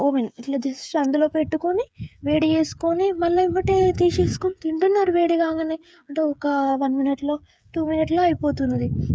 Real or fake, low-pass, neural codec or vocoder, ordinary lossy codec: fake; none; codec, 16 kHz, 8 kbps, FreqCodec, smaller model; none